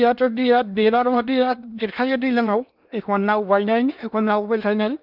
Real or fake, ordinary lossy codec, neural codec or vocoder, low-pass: fake; none; codec, 16 kHz in and 24 kHz out, 0.8 kbps, FocalCodec, streaming, 65536 codes; 5.4 kHz